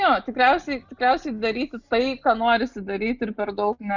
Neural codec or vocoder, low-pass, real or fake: none; 7.2 kHz; real